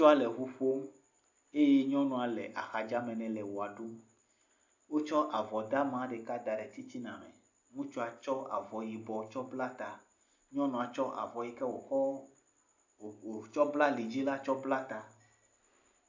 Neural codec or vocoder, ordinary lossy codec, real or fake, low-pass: none; AAC, 48 kbps; real; 7.2 kHz